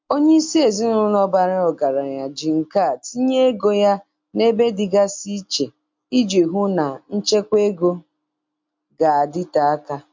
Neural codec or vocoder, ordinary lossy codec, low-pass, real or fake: none; MP3, 48 kbps; 7.2 kHz; real